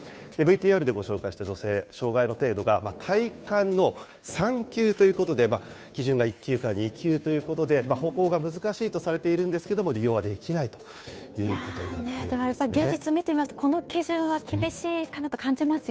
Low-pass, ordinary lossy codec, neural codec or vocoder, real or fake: none; none; codec, 16 kHz, 2 kbps, FunCodec, trained on Chinese and English, 25 frames a second; fake